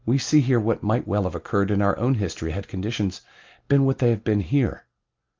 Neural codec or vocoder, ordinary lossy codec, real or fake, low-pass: none; Opus, 32 kbps; real; 7.2 kHz